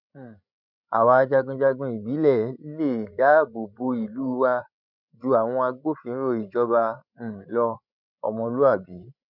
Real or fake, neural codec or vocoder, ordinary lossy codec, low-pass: fake; codec, 16 kHz, 16 kbps, FreqCodec, larger model; none; 5.4 kHz